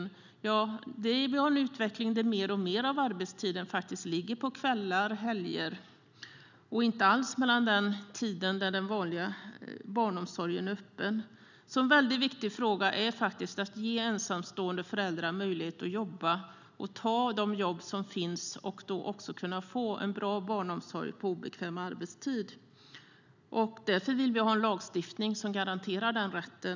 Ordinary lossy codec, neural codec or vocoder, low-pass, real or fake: none; none; 7.2 kHz; real